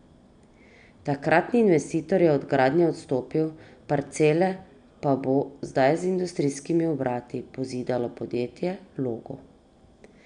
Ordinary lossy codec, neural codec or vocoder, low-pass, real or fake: none; none; 9.9 kHz; real